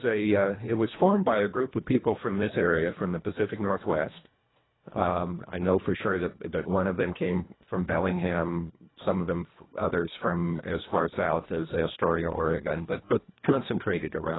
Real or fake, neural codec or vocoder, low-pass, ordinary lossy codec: fake; codec, 24 kHz, 1.5 kbps, HILCodec; 7.2 kHz; AAC, 16 kbps